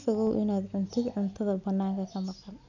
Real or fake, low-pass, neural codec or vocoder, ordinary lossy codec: real; 7.2 kHz; none; none